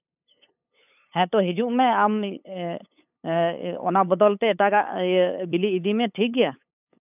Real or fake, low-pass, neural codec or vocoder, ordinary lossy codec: fake; 3.6 kHz; codec, 16 kHz, 8 kbps, FunCodec, trained on LibriTTS, 25 frames a second; none